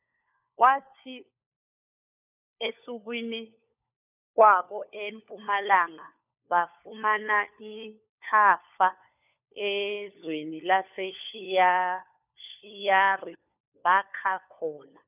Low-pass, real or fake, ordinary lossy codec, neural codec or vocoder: 3.6 kHz; fake; none; codec, 16 kHz, 4 kbps, FunCodec, trained on LibriTTS, 50 frames a second